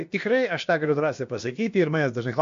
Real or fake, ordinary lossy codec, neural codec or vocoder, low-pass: fake; MP3, 48 kbps; codec, 16 kHz, about 1 kbps, DyCAST, with the encoder's durations; 7.2 kHz